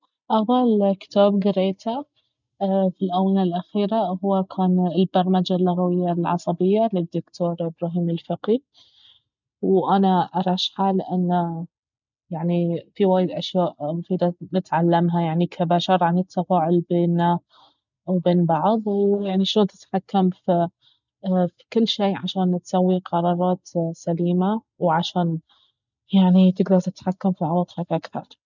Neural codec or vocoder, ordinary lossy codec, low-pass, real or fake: none; none; 7.2 kHz; real